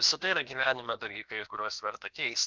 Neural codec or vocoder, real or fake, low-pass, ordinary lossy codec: codec, 16 kHz, about 1 kbps, DyCAST, with the encoder's durations; fake; 7.2 kHz; Opus, 24 kbps